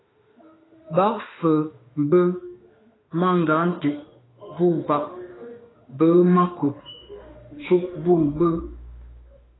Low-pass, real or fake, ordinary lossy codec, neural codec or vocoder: 7.2 kHz; fake; AAC, 16 kbps; autoencoder, 48 kHz, 32 numbers a frame, DAC-VAE, trained on Japanese speech